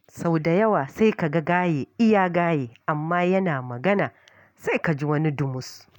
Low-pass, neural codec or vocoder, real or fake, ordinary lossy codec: 19.8 kHz; none; real; none